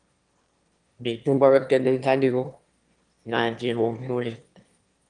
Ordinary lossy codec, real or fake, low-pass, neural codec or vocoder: Opus, 24 kbps; fake; 9.9 kHz; autoencoder, 22.05 kHz, a latent of 192 numbers a frame, VITS, trained on one speaker